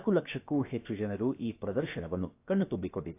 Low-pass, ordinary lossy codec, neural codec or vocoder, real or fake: 3.6 kHz; AAC, 24 kbps; codec, 16 kHz, about 1 kbps, DyCAST, with the encoder's durations; fake